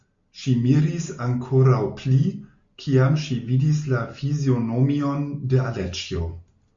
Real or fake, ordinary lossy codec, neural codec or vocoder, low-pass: real; MP3, 48 kbps; none; 7.2 kHz